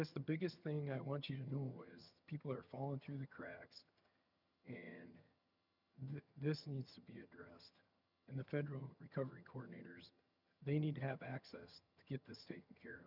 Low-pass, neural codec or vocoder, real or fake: 5.4 kHz; vocoder, 22.05 kHz, 80 mel bands, HiFi-GAN; fake